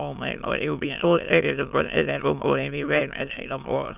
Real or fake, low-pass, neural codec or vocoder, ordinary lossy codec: fake; 3.6 kHz; autoencoder, 22.05 kHz, a latent of 192 numbers a frame, VITS, trained on many speakers; none